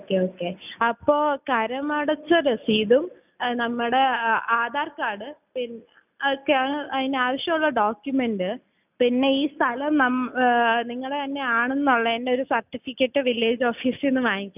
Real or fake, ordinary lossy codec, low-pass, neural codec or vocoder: real; none; 3.6 kHz; none